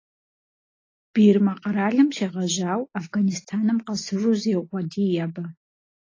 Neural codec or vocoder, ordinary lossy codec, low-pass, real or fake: none; AAC, 32 kbps; 7.2 kHz; real